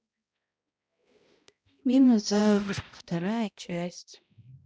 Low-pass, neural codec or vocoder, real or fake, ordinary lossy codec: none; codec, 16 kHz, 0.5 kbps, X-Codec, HuBERT features, trained on balanced general audio; fake; none